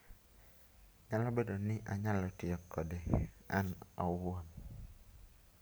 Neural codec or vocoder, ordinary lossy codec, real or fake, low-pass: none; none; real; none